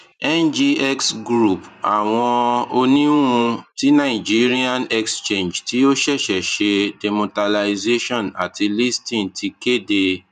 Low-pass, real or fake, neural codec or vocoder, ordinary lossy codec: 14.4 kHz; fake; vocoder, 44.1 kHz, 128 mel bands every 512 samples, BigVGAN v2; none